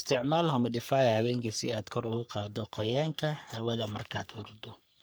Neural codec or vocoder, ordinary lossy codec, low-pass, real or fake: codec, 44.1 kHz, 3.4 kbps, Pupu-Codec; none; none; fake